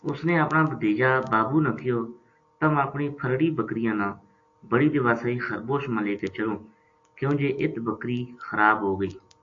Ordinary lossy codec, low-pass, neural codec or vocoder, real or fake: AAC, 48 kbps; 7.2 kHz; none; real